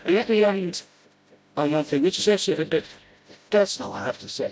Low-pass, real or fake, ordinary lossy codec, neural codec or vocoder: none; fake; none; codec, 16 kHz, 0.5 kbps, FreqCodec, smaller model